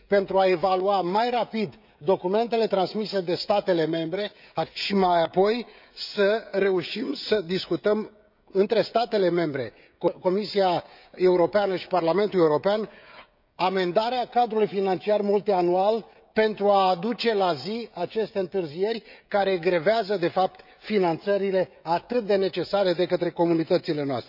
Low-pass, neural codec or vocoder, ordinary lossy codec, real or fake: 5.4 kHz; codec, 16 kHz, 16 kbps, FreqCodec, smaller model; none; fake